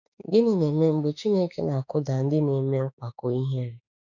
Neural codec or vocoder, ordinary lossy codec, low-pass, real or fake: autoencoder, 48 kHz, 32 numbers a frame, DAC-VAE, trained on Japanese speech; none; 7.2 kHz; fake